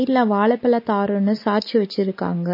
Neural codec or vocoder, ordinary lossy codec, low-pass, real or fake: none; MP3, 24 kbps; 5.4 kHz; real